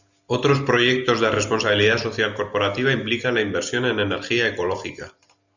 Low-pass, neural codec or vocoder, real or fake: 7.2 kHz; none; real